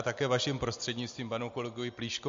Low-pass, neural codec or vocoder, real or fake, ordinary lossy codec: 7.2 kHz; none; real; MP3, 48 kbps